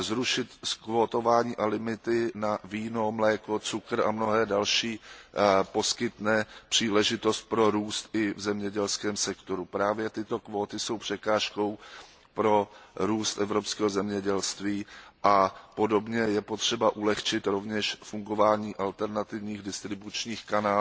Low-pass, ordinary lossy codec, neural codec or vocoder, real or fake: none; none; none; real